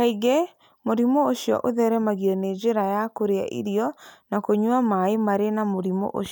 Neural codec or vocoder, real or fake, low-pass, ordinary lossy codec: none; real; none; none